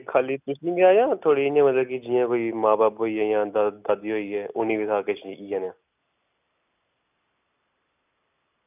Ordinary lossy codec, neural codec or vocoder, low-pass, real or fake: none; none; 3.6 kHz; real